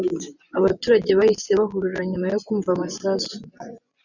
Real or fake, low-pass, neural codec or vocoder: fake; 7.2 kHz; vocoder, 24 kHz, 100 mel bands, Vocos